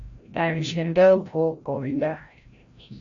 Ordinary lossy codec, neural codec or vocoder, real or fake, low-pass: AAC, 48 kbps; codec, 16 kHz, 0.5 kbps, FreqCodec, larger model; fake; 7.2 kHz